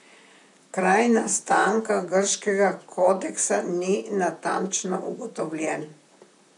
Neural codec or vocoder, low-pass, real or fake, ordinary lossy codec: vocoder, 44.1 kHz, 128 mel bands, Pupu-Vocoder; 10.8 kHz; fake; none